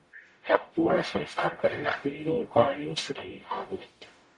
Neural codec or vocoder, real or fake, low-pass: codec, 44.1 kHz, 0.9 kbps, DAC; fake; 10.8 kHz